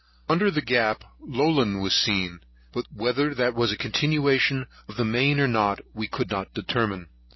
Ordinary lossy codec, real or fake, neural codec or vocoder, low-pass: MP3, 24 kbps; real; none; 7.2 kHz